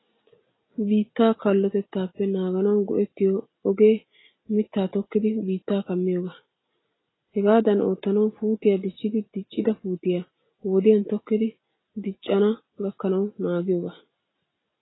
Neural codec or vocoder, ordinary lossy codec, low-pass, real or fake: none; AAC, 16 kbps; 7.2 kHz; real